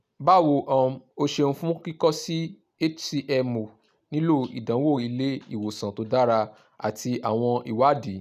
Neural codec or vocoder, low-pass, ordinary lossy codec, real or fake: none; 14.4 kHz; none; real